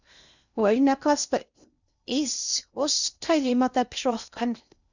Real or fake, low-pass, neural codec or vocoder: fake; 7.2 kHz; codec, 16 kHz in and 24 kHz out, 0.6 kbps, FocalCodec, streaming, 2048 codes